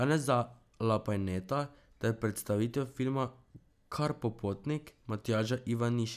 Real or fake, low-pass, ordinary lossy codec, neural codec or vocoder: real; 14.4 kHz; none; none